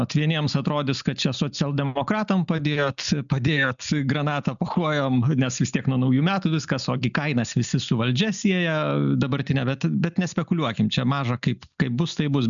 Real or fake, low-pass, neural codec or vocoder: real; 7.2 kHz; none